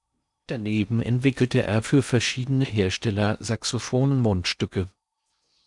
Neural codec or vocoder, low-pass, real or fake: codec, 16 kHz in and 24 kHz out, 0.6 kbps, FocalCodec, streaming, 4096 codes; 10.8 kHz; fake